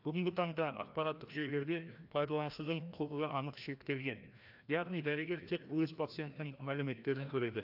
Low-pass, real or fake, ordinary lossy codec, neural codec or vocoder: 5.4 kHz; fake; AAC, 48 kbps; codec, 16 kHz, 1 kbps, FreqCodec, larger model